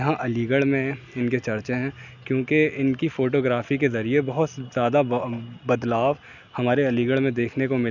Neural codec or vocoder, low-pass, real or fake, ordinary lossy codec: none; 7.2 kHz; real; none